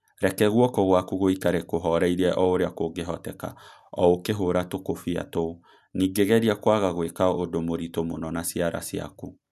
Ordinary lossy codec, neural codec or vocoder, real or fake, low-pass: none; none; real; 14.4 kHz